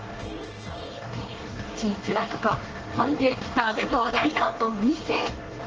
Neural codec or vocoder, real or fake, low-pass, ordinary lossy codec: codec, 24 kHz, 1 kbps, SNAC; fake; 7.2 kHz; Opus, 16 kbps